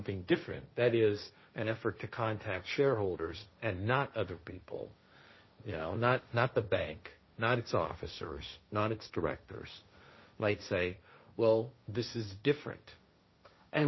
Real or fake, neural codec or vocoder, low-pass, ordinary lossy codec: fake; codec, 16 kHz, 1.1 kbps, Voila-Tokenizer; 7.2 kHz; MP3, 24 kbps